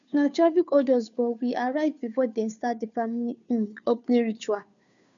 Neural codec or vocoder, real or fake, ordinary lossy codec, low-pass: codec, 16 kHz, 2 kbps, FunCodec, trained on Chinese and English, 25 frames a second; fake; MP3, 96 kbps; 7.2 kHz